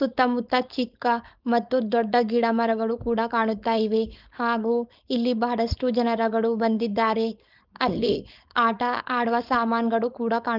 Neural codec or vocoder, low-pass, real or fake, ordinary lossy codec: codec, 16 kHz, 4.8 kbps, FACodec; 5.4 kHz; fake; Opus, 32 kbps